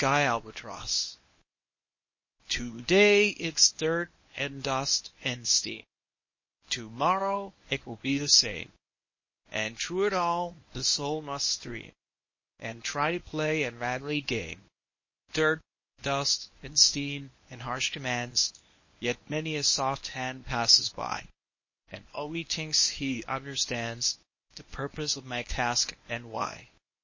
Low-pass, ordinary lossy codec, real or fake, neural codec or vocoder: 7.2 kHz; MP3, 32 kbps; fake; codec, 24 kHz, 0.9 kbps, WavTokenizer, medium speech release version 1